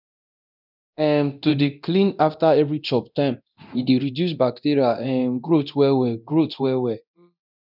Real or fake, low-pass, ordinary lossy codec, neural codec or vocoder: fake; 5.4 kHz; none; codec, 24 kHz, 0.9 kbps, DualCodec